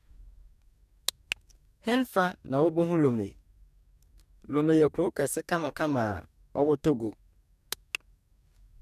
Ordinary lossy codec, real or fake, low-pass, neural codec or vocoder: none; fake; 14.4 kHz; codec, 44.1 kHz, 2.6 kbps, DAC